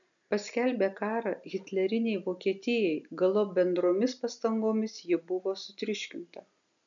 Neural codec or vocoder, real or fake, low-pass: none; real; 7.2 kHz